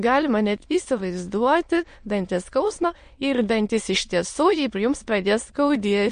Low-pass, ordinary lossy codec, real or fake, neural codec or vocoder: 9.9 kHz; MP3, 48 kbps; fake; autoencoder, 22.05 kHz, a latent of 192 numbers a frame, VITS, trained on many speakers